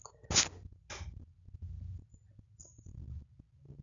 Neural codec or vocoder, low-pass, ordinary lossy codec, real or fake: none; 7.2 kHz; none; real